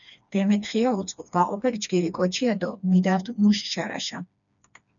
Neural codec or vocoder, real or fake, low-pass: codec, 16 kHz, 2 kbps, FreqCodec, smaller model; fake; 7.2 kHz